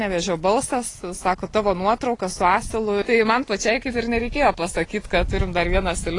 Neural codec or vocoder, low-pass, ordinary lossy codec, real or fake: none; 10.8 kHz; AAC, 32 kbps; real